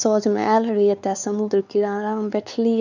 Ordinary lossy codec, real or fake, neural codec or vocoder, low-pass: none; fake; codec, 16 kHz, 4 kbps, X-Codec, WavLM features, trained on Multilingual LibriSpeech; 7.2 kHz